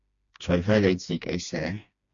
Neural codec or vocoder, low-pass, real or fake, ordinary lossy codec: codec, 16 kHz, 2 kbps, FreqCodec, smaller model; 7.2 kHz; fake; AAC, 64 kbps